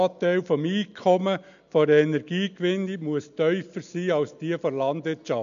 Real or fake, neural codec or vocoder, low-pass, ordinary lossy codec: real; none; 7.2 kHz; MP3, 96 kbps